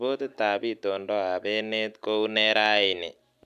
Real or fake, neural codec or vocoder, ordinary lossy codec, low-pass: real; none; none; 14.4 kHz